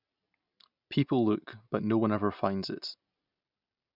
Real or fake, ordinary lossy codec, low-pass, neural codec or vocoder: real; none; 5.4 kHz; none